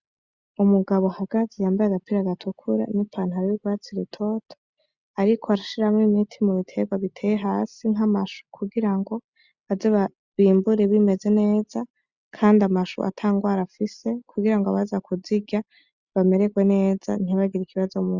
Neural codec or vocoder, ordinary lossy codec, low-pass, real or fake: none; Opus, 64 kbps; 7.2 kHz; real